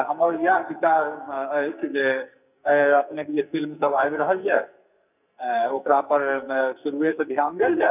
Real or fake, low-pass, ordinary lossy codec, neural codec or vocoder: fake; 3.6 kHz; none; codec, 44.1 kHz, 2.6 kbps, SNAC